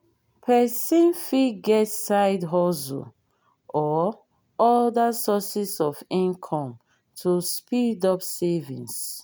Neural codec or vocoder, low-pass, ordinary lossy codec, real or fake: none; none; none; real